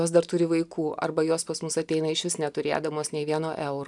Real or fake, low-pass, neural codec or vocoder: real; 10.8 kHz; none